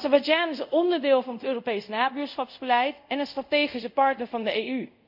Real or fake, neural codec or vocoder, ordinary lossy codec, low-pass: fake; codec, 24 kHz, 0.5 kbps, DualCodec; none; 5.4 kHz